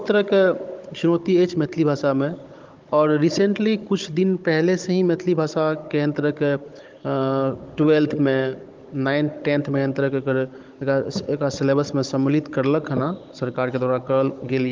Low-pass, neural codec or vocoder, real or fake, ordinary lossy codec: 7.2 kHz; codec, 16 kHz, 16 kbps, FunCodec, trained on Chinese and English, 50 frames a second; fake; Opus, 32 kbps